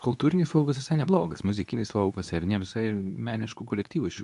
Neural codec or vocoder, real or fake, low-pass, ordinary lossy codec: codec, 24 kHz, 0.9 kbps, WavTokenizer, medium speech release version 2; fake; 10.8 kHz; AAC, 96 kbps